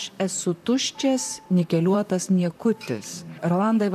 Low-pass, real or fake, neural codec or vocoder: 14.4 kHz; fake; vocoder, 44.1 kHz, 128 mel bands, Pupu-Vocoder